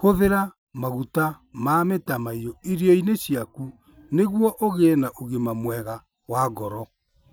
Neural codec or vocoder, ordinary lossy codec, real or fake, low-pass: none; none; real; none